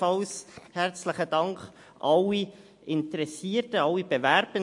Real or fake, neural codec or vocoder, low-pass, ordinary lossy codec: real; none; 10.8 kHz; MP3, 48 kbps